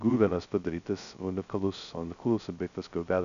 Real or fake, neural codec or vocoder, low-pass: fake; codec, 16 kHz, 0.2 kbps, FocalCodec; 7.2 kHz